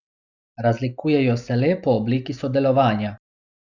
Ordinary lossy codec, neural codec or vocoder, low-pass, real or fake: none; none; 7.2 kHz; real